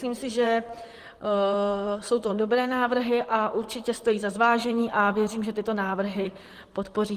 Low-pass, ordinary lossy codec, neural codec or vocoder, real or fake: 14.4 kHz; Opus, 24 kbps; vocoder, 44.1 kHz, 128 mel bands, Pupu-Vocoder; fake